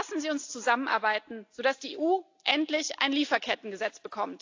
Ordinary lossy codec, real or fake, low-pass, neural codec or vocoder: AAC, 48 kbps; real; 7.2 kHz; none